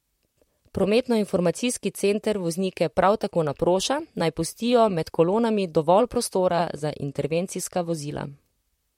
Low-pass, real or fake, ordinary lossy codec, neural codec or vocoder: 19.8 kHz; fake; MP3, 64 kbps; vocoder, 44.1 kHz, 128 mel bands, Pupu-Vocoder